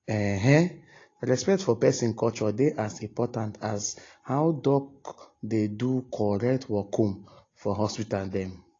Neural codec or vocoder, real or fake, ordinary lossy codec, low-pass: none; real; AAC, 32 kbps; 7.2 kHz